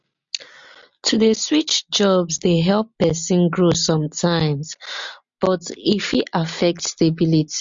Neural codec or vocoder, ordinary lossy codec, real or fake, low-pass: none; MP3, 48 kbps; real; 7.2 kHz